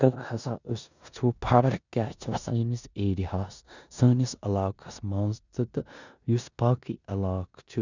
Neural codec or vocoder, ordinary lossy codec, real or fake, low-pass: codec, 16 kHz in and 24 kHz out, 0.9 kbps, LongCat-Audio-Codec, four codebook decoder; none; fake; 7.2 kHz